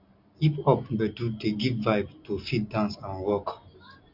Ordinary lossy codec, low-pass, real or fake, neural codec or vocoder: MP3, 48 kbps; 5.4 kHz; real; none